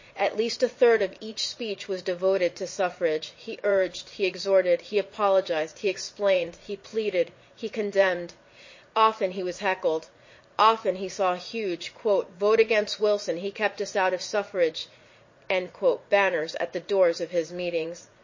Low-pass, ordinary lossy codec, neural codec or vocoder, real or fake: 7.2 kHz; MP3, 32 kbps; vocoder, 44.1 kHz, 80 mel bands, Vocos; fake